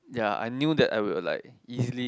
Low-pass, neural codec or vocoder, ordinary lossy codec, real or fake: none; none; none; real